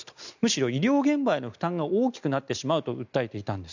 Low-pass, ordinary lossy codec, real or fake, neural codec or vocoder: 7.2 kHz; none; real; none